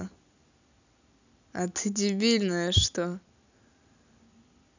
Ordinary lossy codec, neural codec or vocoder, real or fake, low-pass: none; none; real; 7.2 kHz